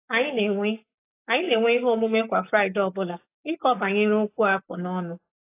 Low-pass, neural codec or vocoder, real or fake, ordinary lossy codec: 3.6 kHz; codec, 44.1 kHz, 7.8 kbps, Pupu-Codec; fake; AAC, 24 kbps